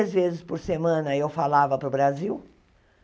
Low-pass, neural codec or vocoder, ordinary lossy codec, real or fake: none; none; none; real